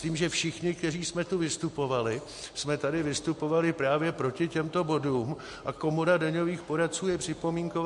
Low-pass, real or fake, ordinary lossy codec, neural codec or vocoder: 14.4 kHz; real; MP3, 48 kbps; none